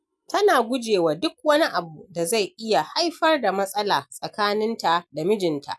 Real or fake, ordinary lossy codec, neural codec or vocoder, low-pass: real; none; none; none